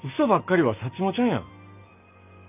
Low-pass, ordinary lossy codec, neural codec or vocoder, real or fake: 3.6 kHz; AAC, 32 kbps; none; real